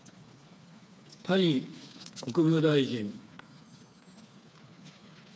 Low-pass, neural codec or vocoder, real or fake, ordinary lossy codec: none; codec, 16 kHz, 4 kbps, FreqCodec, smaller model; fake; none